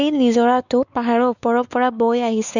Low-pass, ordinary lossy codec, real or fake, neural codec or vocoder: 7.2 kHz; none; fake; codec, 16 kHz, 4 kbps, X-Codec, WavLM features, trained on Multilingual LibriSpeech